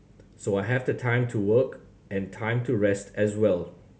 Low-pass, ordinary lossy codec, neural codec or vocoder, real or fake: none; none; none; real